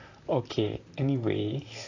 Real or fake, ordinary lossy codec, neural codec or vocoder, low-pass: fake; AAC, 48 kbps; vocoder, 44.1 kHz, 128 mel bands, Pupu-Vocoder; 7.2 kHz